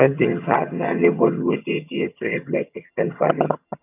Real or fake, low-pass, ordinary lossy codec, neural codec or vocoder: fake; 3.6 kHz; none; vocoder, 22.05 kHz, 80 mel bands, HiFi-GAN